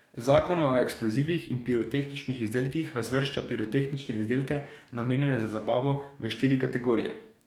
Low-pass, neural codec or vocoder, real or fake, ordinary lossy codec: 19.8 kHz; codec, 44.1 kHz, 2.6 kbps, DAC; fake; none